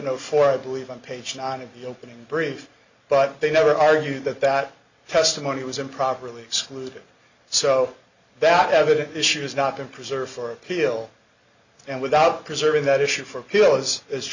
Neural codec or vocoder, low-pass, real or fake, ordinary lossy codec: none; 7.2 kHz; real; Opus, 64 kbps